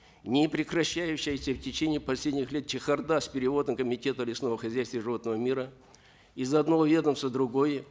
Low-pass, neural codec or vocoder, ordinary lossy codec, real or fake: none; none; none; real